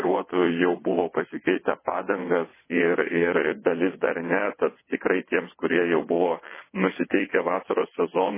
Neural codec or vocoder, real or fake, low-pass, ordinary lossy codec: vocoder, 22.05 kHz, 80 mel bands, WaveNeXt; fake; 3.6 kHz; MP3, 16 kbps